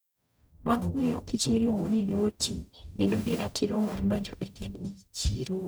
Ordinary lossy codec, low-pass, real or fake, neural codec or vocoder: none; none; fake; codec, 44.1 kHz, 0.9 kbps, DAC